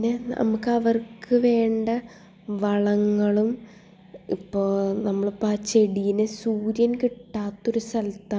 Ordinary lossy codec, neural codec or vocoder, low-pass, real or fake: none; none; none; real